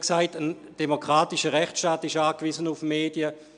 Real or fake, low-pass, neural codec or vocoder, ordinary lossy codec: real; 9.9 kHz; none; none